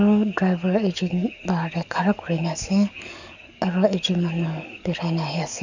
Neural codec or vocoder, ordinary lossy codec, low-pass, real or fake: codec, 44.1 kHz, 7.8 kbps, DAC; none; 7.2 kHz; fake